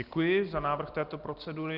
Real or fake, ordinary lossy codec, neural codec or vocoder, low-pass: real; Opus, 32 kbps; none; 5.4 kHz